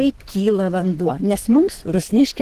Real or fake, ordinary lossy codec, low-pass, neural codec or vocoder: fake; Opus, 16 kbps; 14.4 kHz; codec, 32 kHz, 1.9 kbps, SNAC